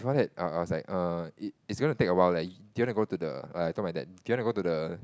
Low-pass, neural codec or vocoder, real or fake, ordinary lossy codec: none; none; real; none